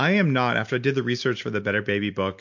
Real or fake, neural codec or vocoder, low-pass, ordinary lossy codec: real; none; 7.2 kHz; MP3, 48 kbps